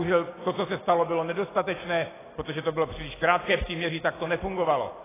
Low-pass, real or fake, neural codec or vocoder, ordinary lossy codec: 3.6 kHz; real; none; AAC, 16 kbps